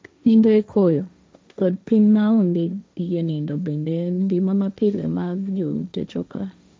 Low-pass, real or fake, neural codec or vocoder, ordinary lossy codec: none; fake; codec, 16 kHz, 1.1 kbps, Voila-Tokenizer; none